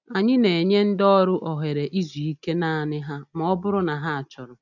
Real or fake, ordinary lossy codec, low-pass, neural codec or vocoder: real; none; 7.2 kHz; none